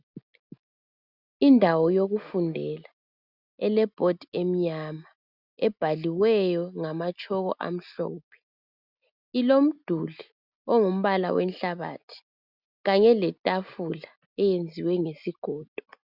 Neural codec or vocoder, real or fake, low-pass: none; real; 5.4 kHz